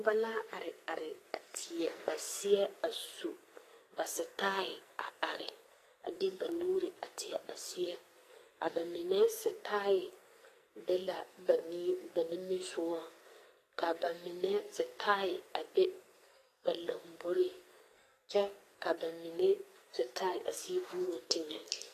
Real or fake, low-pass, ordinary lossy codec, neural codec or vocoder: fake; 14.4 kHz; AAC, 48 kbps; codec, 44.1 kHz, 2.6 kbps, SNAC